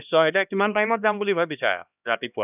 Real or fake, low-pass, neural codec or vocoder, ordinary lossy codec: fake; 3.6 kHz; codec, 16 kHz, 1 kbps, X-Codec, HuBERT features, trained on LibriSpeech; none